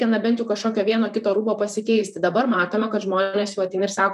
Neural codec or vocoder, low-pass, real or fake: vocoder, 44.1 kHz, 128 mel bands, Pupu-Vocoder; 14.4 kHz; fake